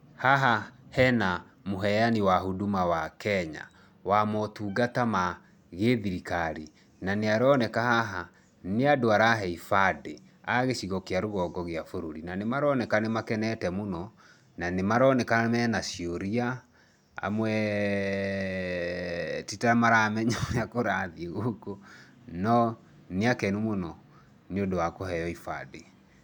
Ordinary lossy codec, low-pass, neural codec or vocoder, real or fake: none; 19.8 kHz; none; real